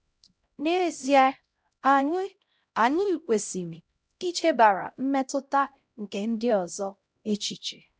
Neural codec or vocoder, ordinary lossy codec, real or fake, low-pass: codec, 16 kHz, 0.5 kbps, X-Codec, HuBERT features, trained on LibriSpeech; none; fake; none